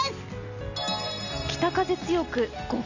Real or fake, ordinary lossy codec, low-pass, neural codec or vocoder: real; none; 7.2 kHz; none